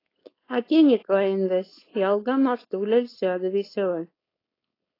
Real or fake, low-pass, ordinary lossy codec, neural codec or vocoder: fake; 5.4 kHz; AAC, 24 kbps; codec, 16 kHz, 4.8 kbps, FACodec